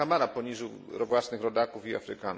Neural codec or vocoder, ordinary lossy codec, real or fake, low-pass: none; none; real; none